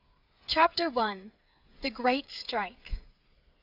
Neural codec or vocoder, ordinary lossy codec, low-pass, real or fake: codec, 16 kHz, 8 kbps, FreqCodec, smaller model; Opus, 64 kbps; 5.4 kHz; fake